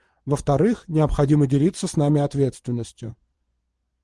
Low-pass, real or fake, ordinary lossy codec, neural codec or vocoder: 10.8 kHz; fake; Opus, 24 kbps; vocoder, 24 kHz, 100 mel bands, Vocos